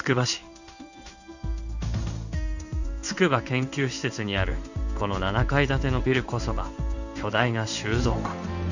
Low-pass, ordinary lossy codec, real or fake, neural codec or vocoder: 7.2 kHz; none; fake; codec, 16 kHz in and 24 kHz out, 1 kbps, XY-Tokenizer